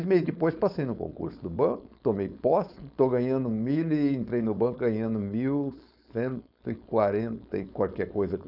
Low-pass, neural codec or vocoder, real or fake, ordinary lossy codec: 5.4 kHz; codec, 16 kHz, 4.8 kbps, FACodec; fake; none